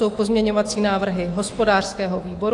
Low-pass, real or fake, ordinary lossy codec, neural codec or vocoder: 10.8 kHz; fake; AAC, 48 kbps; autoencoder, 48 kHz, 128 numbers a frame, DAC-VAE, trained on Japanese speech